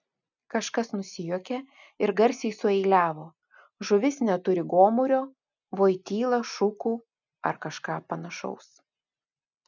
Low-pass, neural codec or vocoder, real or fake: 7.2 kHz; none; real